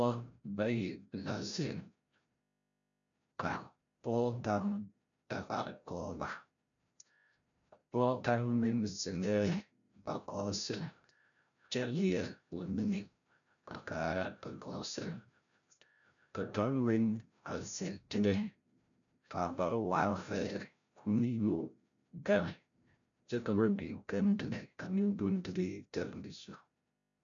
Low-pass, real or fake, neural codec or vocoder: 7.2 kHz; fake; codec, 16 kHz, 0.5 kbps, FreqCodec, larger model